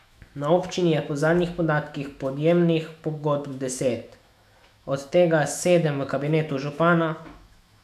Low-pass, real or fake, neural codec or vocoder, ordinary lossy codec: 14.4 kHz; fake; autoencoder, 48 kHz, 128 numbers a frame, DAC-VAE, trained on Japanese speech; none